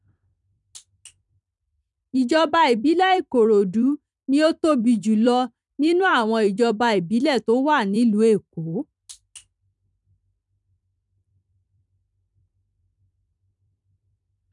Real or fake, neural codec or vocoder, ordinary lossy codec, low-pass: fake; vocoder, 44.1 kHz, 128 mel bands every 256 samples, BigVGAN v2; none; 10.8 kHz